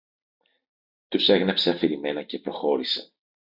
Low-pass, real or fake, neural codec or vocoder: 5.4 kHz; real; none